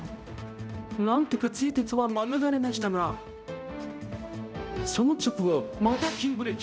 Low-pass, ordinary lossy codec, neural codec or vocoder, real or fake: none; none; codec, 16 kHz, 0.5 kbps, X-Codec, HuBERT features, trained on balanced general audio; fake